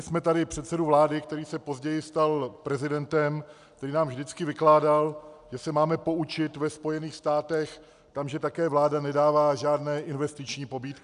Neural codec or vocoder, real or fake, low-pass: none; real; 10.8 kHz